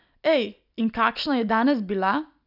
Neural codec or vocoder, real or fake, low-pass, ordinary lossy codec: none; real; 5.4 kHz; none